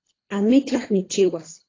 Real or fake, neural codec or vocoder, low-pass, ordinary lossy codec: fake; codec, 24 kHz, 3 kbps, HILCodec; 7.2 kHz; AAC, 32 kbps